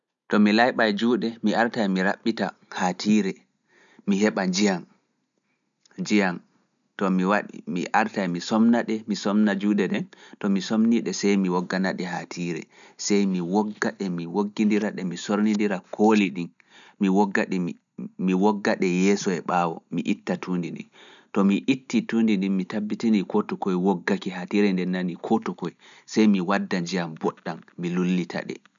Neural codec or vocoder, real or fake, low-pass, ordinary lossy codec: none; real; 7.2 kHz; none